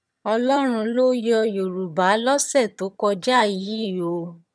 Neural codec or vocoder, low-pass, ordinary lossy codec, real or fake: vocoder, 22.05 kHz, 80 mel bands, HiFi-GAN; none; none; fake